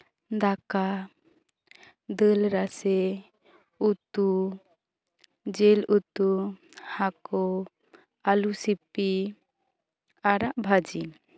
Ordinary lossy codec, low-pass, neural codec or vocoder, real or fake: none; none; none; real